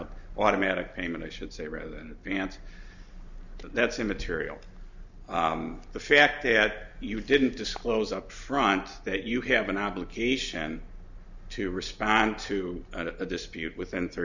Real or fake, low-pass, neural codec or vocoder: real; 7.2 kHz; none